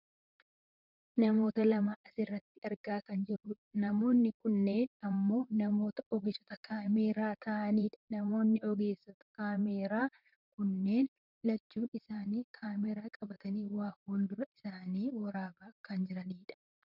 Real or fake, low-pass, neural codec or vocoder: fake; 5.4 kHz; vocoder, 44.1 kHz, 128 mel bands, Pupu-Vocoder